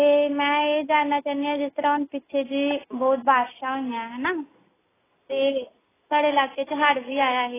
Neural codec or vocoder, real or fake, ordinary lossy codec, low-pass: none; real; AAC, 16 kbps; 3.6 kHz